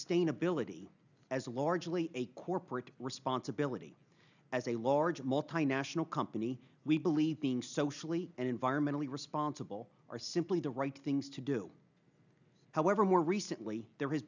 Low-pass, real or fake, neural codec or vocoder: 7.2 kHz; real; none